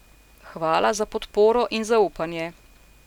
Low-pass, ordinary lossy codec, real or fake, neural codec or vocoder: 19.8 kHz; none; real; none